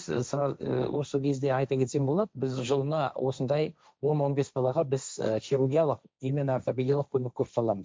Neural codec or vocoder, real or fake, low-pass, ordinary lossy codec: codec, 16 kHz, 1.1 kbps, Voila-Tokenizer; fake; none; none